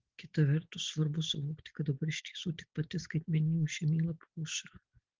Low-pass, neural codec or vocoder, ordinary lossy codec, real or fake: 7.2 kHz; none; Opus, 16 kbps; real